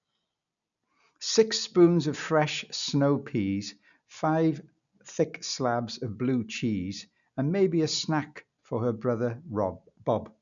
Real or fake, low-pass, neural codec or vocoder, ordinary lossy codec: real; 7.2 kHz; none; none